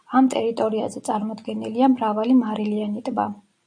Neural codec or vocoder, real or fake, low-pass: none; real; 9.9 kHz